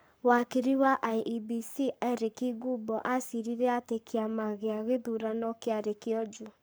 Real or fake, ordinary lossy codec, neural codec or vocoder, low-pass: fake; none; codec, 44.1 kHz, 7.8 kbps, Pupu-Codec; none